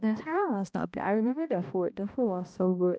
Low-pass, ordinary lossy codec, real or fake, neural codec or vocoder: none; none; fake; codec, 16 kHz, 1 kbps, X-Codec, HuBERT features, trained on balanced general audio